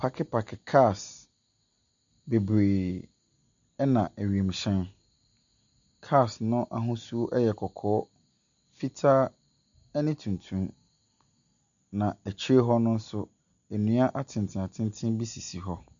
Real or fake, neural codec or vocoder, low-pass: real; none; 7.2 kHz